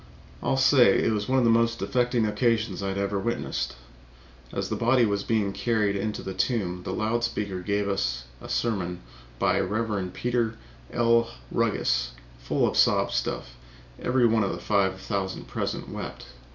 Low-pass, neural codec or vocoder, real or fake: 7.2 kHz; none; real